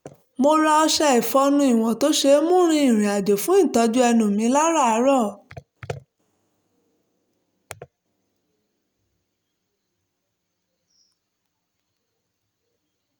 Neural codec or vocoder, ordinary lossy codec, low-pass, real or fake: none; none; none; real